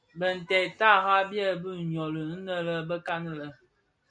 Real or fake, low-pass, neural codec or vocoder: real; 9.9 kHz; none